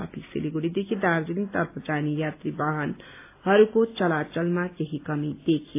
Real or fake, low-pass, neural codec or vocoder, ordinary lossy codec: real; 3.6 kHz; none; AAC, 24 kbps